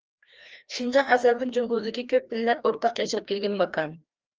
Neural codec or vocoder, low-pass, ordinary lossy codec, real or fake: codec, 16 kHz, 1 kbps, FreqCodec, larger model; 7.2 kHz; Opus, 24 kbps; fake